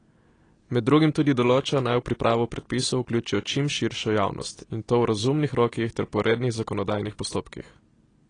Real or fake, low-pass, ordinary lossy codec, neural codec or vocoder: real; 9.9 kHz; AAC, 32 kbps; none